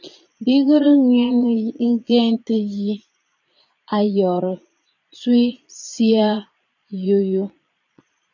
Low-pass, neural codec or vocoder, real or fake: 7.2 kHz; vocoder, 22.05 kHz, 80 mel bands, Vocos; fake